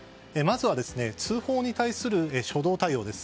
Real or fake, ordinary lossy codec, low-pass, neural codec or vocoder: real; none; none; none